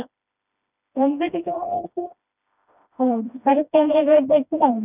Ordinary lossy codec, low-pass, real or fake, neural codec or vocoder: none; 3.6 kHz; fake; codec, 16 kHz, 1 kbps, FreqCodec, smaller model